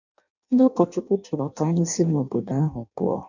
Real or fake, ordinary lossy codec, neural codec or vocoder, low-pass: fake; none; codec, 16 kHz in and 24 kHz out, 0.6 kbps, FireRedTTS-2 codec; 7.2 kHz